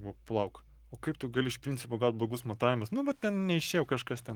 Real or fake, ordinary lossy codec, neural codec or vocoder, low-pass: fake; Opus, 24 kbps; codec, 44.1 kHz, 7.8 kbps, Pupu-Codec; 19.8 kHz